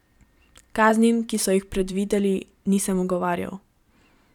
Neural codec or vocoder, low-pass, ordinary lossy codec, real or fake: vocoder, 44.1 kHz, 128 mel bands every 512 samples, BigVGAN v2; 19.8 kHz; none; fake